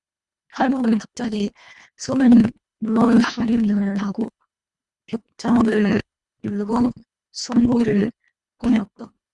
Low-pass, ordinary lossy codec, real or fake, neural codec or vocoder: 10.8 kHz; Opus, 64 kbps; fake; codec, 24 kHz, 1.5 kbps, HILCodec